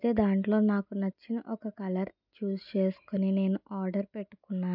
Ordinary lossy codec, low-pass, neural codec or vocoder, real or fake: none; 5.4 kHz; none; real